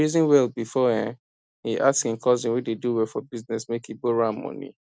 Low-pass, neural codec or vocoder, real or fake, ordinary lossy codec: none; none; real; none